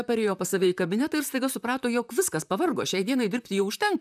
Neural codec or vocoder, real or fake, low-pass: vocoder, 44.1 kHz, 128 mel bands, Pupu-Vocoder; fake; 14.4 kHz